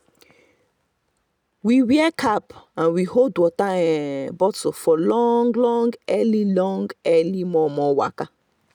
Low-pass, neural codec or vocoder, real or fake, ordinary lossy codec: 19.8 kHz; none; real; none